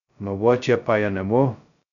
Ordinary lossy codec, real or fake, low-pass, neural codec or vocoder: none; fake; 7.2 kHz; codec, 16 kHz, 0.2 kbps, FocalCodec